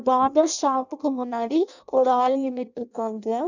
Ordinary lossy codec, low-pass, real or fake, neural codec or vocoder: none; 7.2 kHz; fake; codec, 16 kHz in and 24 kHz out, 0.6 kbps, FireRedTTS-2 codec